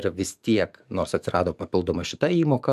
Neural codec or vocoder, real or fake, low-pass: codec, 44.1 kHz, 7.8 kbps, DAC; fake; 14.4 kHz